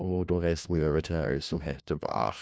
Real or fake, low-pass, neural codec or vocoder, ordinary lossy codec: fake; none; codec, 16 kHz, 1 kbps, FunCodec, trained on LibriTTS, 50 frames a second; none